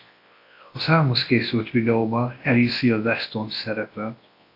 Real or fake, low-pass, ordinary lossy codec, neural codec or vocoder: fake; 5.4 kHz; AAC, 32 kbps; codec, 24 kHz, 0.9 kbps, WavTokenizer, large speech release